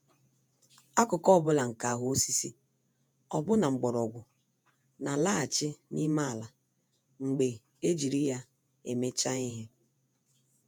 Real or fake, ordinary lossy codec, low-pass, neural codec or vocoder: fake; none; none; vocoder, 48 kHz, 128 mel bands, Vocos